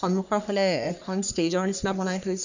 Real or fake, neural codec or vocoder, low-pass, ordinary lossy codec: fake; codec, 44.1 kHz, 3.4 kbps, Pupu-Codec; 7.2 kHz; none